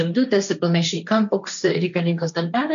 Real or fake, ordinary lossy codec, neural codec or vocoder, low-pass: fake; MP3, 96 kbps; codec, 16 kHz, 1.1 kbps, Voila-Tokenizer; 7.2 kHz